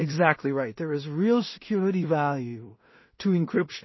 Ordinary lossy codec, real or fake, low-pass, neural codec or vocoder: MP3, 24 kbps; fake; 7.2 kHz; codec, 16 kHz in and 24 kHz out, 0.4 kbps, LongCat-Audio-Codec, two codebook decoder